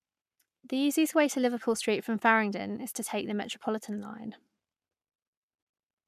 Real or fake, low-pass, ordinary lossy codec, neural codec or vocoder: fake; 14.4 kHz; none; codec, 44.1 kHz, 7.8 kbps, Pupu-Codec